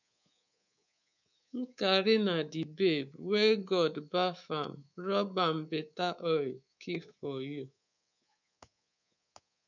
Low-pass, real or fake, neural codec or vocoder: 7.2 kHz; fake; codec, 24 kHz, 3.1 kbps, DualCodec